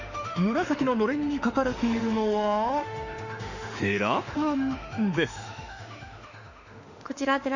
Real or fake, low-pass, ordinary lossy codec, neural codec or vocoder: fake; 7.2 kHz; none; autoencoder, 48 kHz, 32 numbers a frame, DAC-VAE, trained on Japanese speech